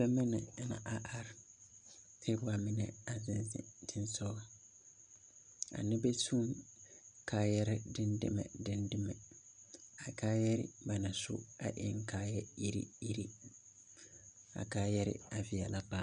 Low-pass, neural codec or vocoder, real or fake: 9.9 kHz; none; real